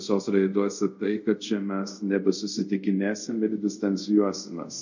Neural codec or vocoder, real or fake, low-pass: codec, 24 kHz, 0.5 kbps, DualCodec; fake; 7.2 kHz